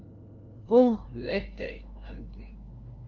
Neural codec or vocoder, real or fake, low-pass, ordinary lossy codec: codec, 16 kHz, 0.5 kbps, FunCodec, trained on LibriTTS, 25 frames a second; fake; 7.2 kHz; Opus, 32 kbps